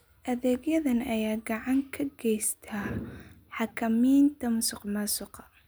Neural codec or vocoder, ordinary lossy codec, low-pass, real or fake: none; none; none; real